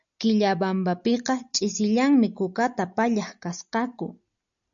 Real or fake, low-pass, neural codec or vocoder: real; 7.2 kHz; none